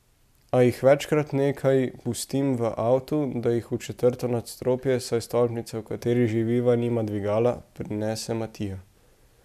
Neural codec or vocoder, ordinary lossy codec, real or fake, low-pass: none; MP3, 96 kbps; real; 14.4 kHz